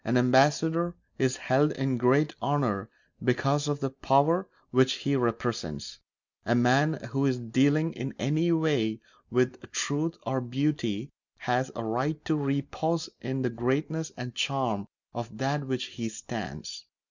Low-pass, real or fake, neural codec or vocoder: 7.2 kHz; real; none